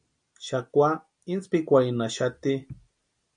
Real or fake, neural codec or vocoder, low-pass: real; none; 9.9 kHz